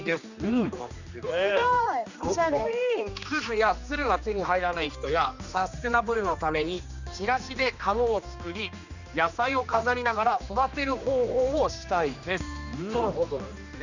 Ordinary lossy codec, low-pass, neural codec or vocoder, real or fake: none; 7.2 kHz; codec, 16 kHz, 2 kbps, X-Codec, HuBERT features, trained on general audio; fake